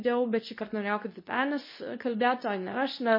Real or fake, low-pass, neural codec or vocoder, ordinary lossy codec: fake; 5.4 kHz; codec, 24 kHz, 0.9 kbps, WavTokenizer, medium speech release version 2; MP3, 24 kbps